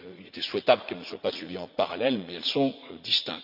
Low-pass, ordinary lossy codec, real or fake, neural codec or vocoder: 5.4 kHz; none; fake; vocoder, 44.1 kHz, 80 mel bands, Vocos